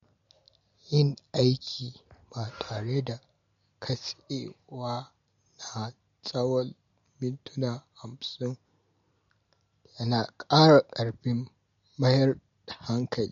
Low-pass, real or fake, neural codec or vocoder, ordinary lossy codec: 7.2 kHz; real; none; MP3, 48 kbps